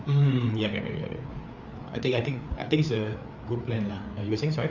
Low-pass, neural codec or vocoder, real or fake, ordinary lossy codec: 7.2 kHz; codec, 16 kHz, 4 kbps, FreqCodec, larger model; fake; none